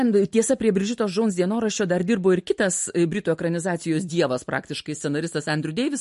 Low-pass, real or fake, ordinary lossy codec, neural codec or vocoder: 14.4 kHz; real; MP3, 48 kbps; none